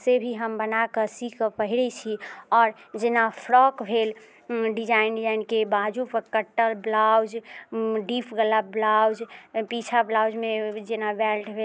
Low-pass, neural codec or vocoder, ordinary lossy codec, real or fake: none; none; none; real